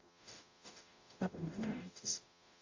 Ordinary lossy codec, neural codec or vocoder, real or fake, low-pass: AAC, 48 kbps; codec, 44.1 kHz, 0.9 kbps, DAC; fake; 7.2 kHz